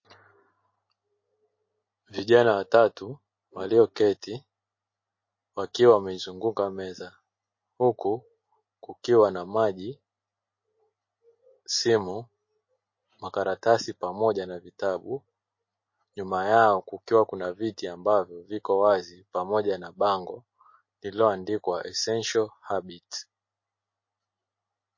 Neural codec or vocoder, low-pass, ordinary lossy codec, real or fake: none; 7.2 kHz; MP3, 32 kbps; real